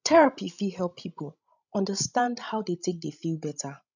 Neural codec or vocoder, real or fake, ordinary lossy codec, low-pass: codec, 16 kHz, 16 kbps, FreqCodec, larger model; fake; none; 7.2 kHz